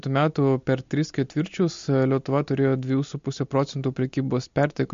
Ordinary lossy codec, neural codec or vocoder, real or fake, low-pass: MP3, 48 kbps; none; real; 7.2 kHz